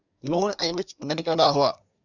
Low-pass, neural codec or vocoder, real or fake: 7.2 kHz; codec, 24 kHz, 1 kbps, SNAC; fake